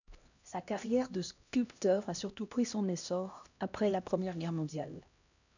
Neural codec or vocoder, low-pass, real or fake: codec, 16 kHz, 1 kbps, X-Codec, HuBERT features, trained on LibriSpeech; 7.2 kHz; fake